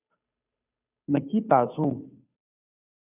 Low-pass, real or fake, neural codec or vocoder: 3.6 kHz; fake; codec, 16 kHz, 2 kbps, FunCodec, trained on Chinese and English, 25 frames a second